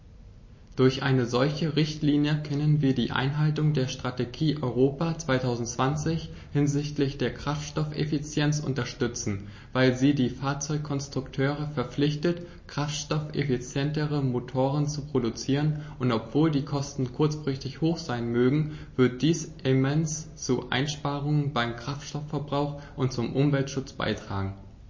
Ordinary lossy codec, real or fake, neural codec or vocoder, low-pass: MP3, 32 kbps; real; none; 7.2 kHz